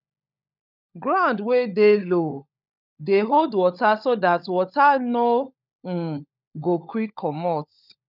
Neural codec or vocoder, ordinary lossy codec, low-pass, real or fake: codec, 16 kHz, 16 kbps, FunCodec, trained on LibriTTS, 50 frames a second; none; 5.4 kHz; fake